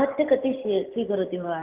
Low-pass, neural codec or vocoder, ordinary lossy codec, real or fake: 3.6 kHz; none; Opus, 32 kbps; real